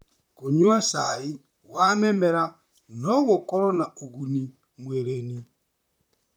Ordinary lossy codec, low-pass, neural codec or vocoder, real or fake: none; none; vocoder, 44.1 kHz, 128 mel bands, Pupu-Vocoder; fake